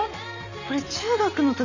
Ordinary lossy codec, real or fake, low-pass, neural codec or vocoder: none; real; 7.2 kHz; none